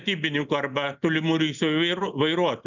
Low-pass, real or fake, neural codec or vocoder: 7.2 kHz; real; none